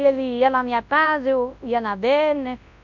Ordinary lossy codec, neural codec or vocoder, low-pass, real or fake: none; codec, 24 kHz, 0.9 kbps, WavTokenizer, large speech release; 7.2 kHz; fake